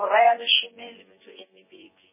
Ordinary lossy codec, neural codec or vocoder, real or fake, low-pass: MP3, 16 kbps; vocoder, 24 kHz, 100 mel bands, Vocos; fake; 3.6 kHz